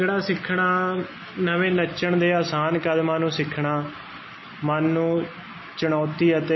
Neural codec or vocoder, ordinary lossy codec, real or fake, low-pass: none; MP3, 24 kbps; real; 7.2 kHz